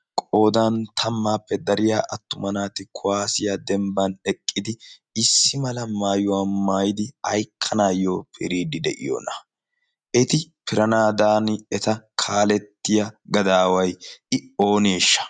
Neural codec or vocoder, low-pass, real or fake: none; 9.9 kHz; real